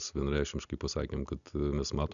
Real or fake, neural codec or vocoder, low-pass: real; none; 7.2 kHz